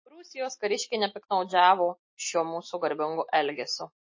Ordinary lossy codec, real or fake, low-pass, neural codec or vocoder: MP3, 32 kbps; real; 7.2 kHz; none